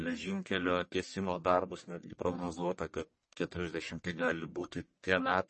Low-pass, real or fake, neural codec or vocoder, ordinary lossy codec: 10.8 kHz; fake; codec, 44.1 kHz, 1.7 kbps, Pupu-Codec; MP3, 32 kbps